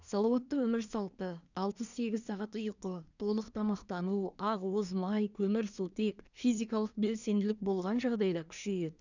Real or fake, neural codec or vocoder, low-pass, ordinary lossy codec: fake; codec, 24 kHz, 1 kbps, SNAC; 7.2 kHz; none